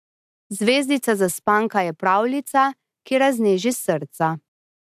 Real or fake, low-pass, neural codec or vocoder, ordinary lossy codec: real; 14.4 kHz; none; AAC, 96 kbps